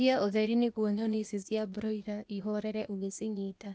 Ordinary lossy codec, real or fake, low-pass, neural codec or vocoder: none; fake; none; codec, 16 kHz, 0.8 kbps, ZipCodec